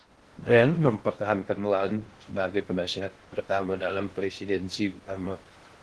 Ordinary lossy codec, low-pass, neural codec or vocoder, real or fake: Opus, 16 kbps; 10.8 kHz; codec, 16 kHz in and 24 kHz out, 0.6 kbps, FocalCodec, streaming, 4096 codes; fake